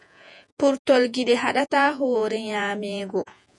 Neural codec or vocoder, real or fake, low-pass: vocoder, 48 kHz, 128 mel bands, Vocos; fake; 10.8 kHz